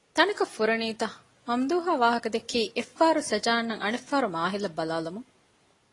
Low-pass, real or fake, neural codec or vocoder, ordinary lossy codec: 10.8 kHz; real; none; AAC, 32 kbps